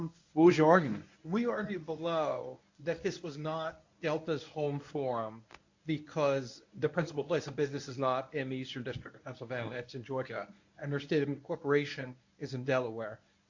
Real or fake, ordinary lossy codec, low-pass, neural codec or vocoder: fake; AAC, 48 kbps; 7.2 kHz; codec, 24 kHz, 0.9 kbps, WavTokenizer, medium speech release version 1